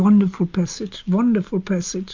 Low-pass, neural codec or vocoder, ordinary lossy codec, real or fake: 7.2 kHz; none; MP3, 64 kbps; real